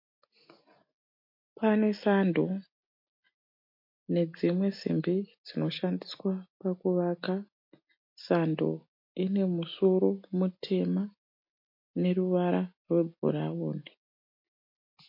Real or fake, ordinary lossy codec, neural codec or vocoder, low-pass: real; MP3, 32 kbps; none; 5.4 kHz